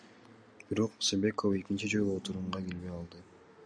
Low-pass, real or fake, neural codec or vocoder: 9.9 kHz; real; none